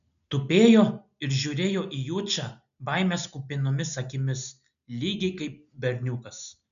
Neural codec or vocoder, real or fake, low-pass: none; real; 7.2 kHz